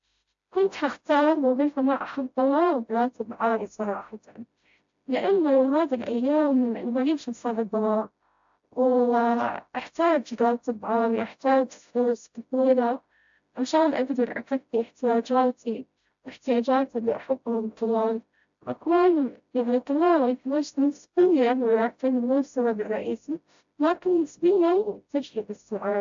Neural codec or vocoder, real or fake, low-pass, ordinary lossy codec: codec, 16 kHz, 0.5 kbps, FreqCodec, smaller model; fake; 7.2 kHz; AAC, 64 kbps